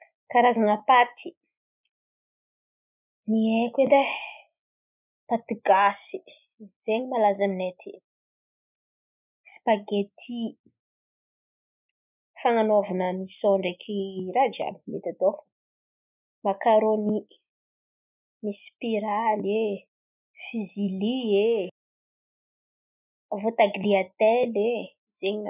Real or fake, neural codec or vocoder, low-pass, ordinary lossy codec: real; none; 3.6 kHz; none